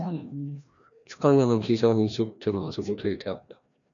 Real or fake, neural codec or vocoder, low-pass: fake; codec, 16 kHz, 1 kbps, FreqCodec, larger model; 7.2 kHz